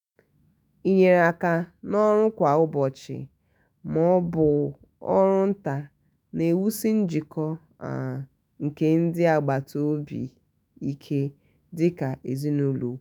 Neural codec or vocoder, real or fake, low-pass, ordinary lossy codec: autoencoder, 48 kHz, 128 numbers a frame, DAC-VAE, trained on Japanese speech; fake; none; none